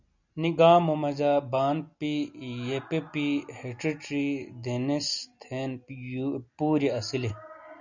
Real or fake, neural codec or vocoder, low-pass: real; none; 7.2 kHz